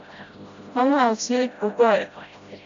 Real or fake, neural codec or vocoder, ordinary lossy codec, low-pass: fake; codec, 16 kHz, 0.5 kbps, FreqCodec, smaller model; MP3, 64 kbps; 7.2 kHz